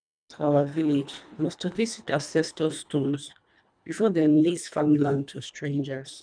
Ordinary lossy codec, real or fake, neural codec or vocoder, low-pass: none; fake; codec, 24 kHz, 1.5 kbps, HILCodec; 9.9 kHz